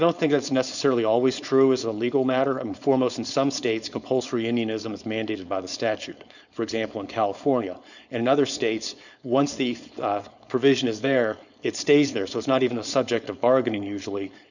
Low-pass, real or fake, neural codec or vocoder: 7.2 kHz; fake; codec, 16 kHz, 4.8 kbps, FACodec